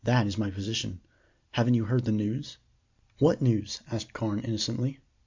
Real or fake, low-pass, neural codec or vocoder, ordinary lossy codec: real; 7.2 kHz; none; MP3, 64 kbps